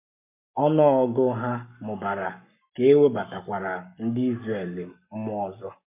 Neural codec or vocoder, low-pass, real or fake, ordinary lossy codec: codec, 44.1 kHz, 7.8 kbps, Pupu-Codec; 3.6 kHz; fake; MP3, 32 kbps